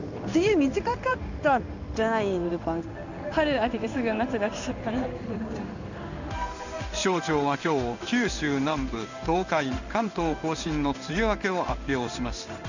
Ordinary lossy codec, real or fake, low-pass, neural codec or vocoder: none; fake; 7.2 kHz; codec, 16 kHz in and 24 kHz out, 1 kbps, XY-Tokenizer